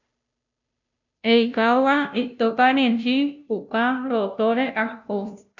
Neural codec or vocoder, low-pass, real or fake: codec, 16 kHz, 0.5 kbps, FunCodec, trained on Chinese and English, 25 frames a second; 7.2 kHz; fake